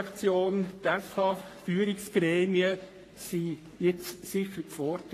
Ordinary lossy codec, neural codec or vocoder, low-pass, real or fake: AAC, 48 kbps; codec, 44.1 kHz, 3.4 kbps, Pupu-Codec; 14.4 kHz; fake